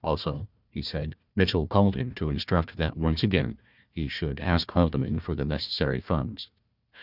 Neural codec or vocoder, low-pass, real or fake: codec, 16 kHz, 1 kbps, FunCodec, trained on Chinese and English, 50 frames a second; 5.4 kHz; fake